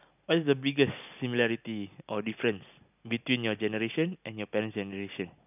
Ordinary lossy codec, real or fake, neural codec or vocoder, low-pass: none; real; none; 3.6 kHz